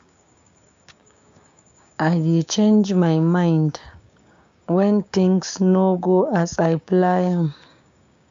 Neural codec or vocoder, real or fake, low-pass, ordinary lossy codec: codec, 16 kHz, 6 kbps, DAC; fake; 7.2 kHz; none